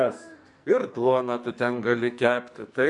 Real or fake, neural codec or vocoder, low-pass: fake; codec, 44.1 kHz, 2.6 kbps, SNAC; 10.8 kHz